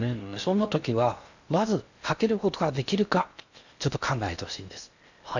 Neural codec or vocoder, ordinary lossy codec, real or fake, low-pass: codec, 16 kHz in and 24 kHz out, 0.8 kbps, FocalCodec, streaming, 65536 codes; none; fake; 7.2 kHz